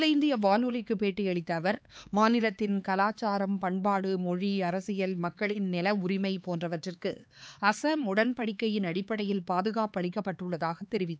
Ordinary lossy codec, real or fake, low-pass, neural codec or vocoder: none; fake; none; codec, 16 kHz, 4 kbps, X-Codec, HuBERT features, trained on LibriSpeech